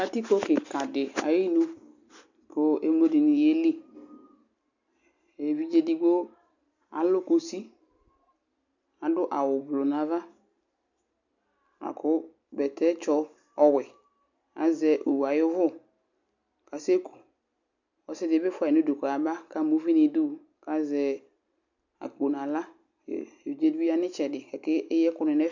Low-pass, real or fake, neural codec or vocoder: 7.2 kHz; real; none